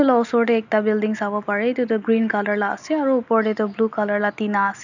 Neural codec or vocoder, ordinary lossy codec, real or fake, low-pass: none; none; real; 7.2 kHz